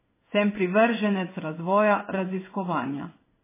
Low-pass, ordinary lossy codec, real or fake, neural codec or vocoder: 3.6 kHz; MP3, 16 kbps; fake; codec, 16 kHz in and 24 kHz out, 1 kbps, XY-Tokenizer